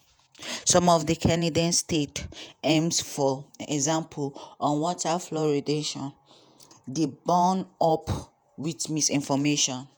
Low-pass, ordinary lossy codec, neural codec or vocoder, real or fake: none; none; vocoder, 48 kHz, 128 mel bands, Vocos; fake